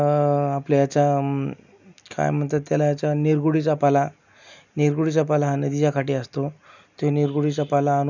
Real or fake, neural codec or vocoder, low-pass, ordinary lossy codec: real; none; 7.2 kHz; none